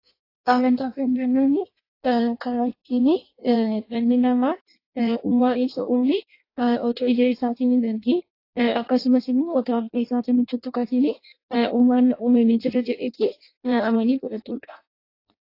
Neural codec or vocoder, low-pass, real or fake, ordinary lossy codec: codec, 16 kHz in and 24 kHz out, 0.6 kbps, FireRedTTS-2 codec; 5.4 kHz; fake; AAC, 32 kbps